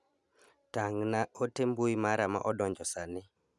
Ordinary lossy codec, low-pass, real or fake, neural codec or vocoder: none; none; real; none